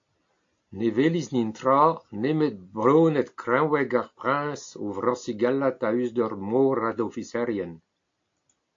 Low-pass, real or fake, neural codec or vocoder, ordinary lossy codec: 7.2 kHz; real; none; AAC, 48 kbps